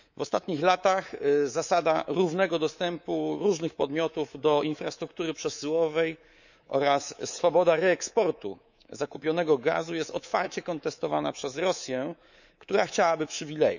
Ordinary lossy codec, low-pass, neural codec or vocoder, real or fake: none; 7.2 kHz; codec, 24 kHz, 3.1 kbps, DualCodec; fake